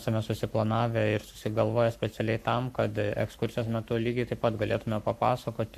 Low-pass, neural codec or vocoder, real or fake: 14.4 kHz; codec, 44.1 kHz, 7.8 kbps, Pupu-Codec; fake